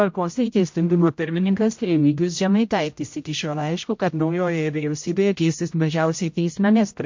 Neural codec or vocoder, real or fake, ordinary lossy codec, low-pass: codec, 16 kHz, 0.5 kbps, X-Codec, HuBERT features, trained on general audio; fake; MP3, 48 kbps; 7.2 kHz